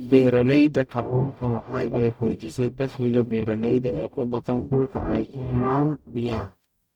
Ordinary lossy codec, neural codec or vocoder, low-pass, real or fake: none; codec, 44.1 kHz, 0.9 kbps, DAC; 19.8 kHz; fake